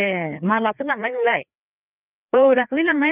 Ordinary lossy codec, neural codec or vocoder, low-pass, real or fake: none; codec, 16 kHz, 2 kbps, FreqCodec, larger model; 3.6 kHz; fake